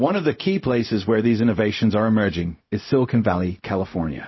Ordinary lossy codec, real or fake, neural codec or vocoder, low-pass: MP3, 24 kbps; fake; codec, 16 kHz, 0.4 kbps, LongCat-Audio-Codec; 7.2 kHz